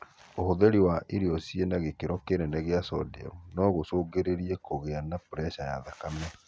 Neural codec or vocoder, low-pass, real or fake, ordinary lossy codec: none; none; real; none